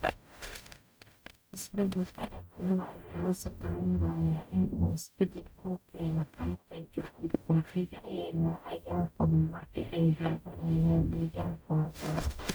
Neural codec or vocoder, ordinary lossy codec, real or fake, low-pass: codec, 44.1 kHz, 0.9 kbps, DAC; none; fake; none